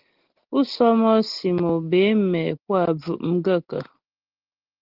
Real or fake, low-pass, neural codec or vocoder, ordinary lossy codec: real; 5.4 kHz; none; Opus, 16 kbps